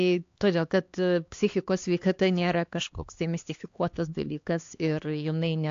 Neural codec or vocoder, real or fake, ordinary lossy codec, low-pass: codec, 16 kHz, 2 kbps, X-Codec, HuBERT features, trained on LibriSpeech; fake; AAC, 48 kbps; 7.2 kHz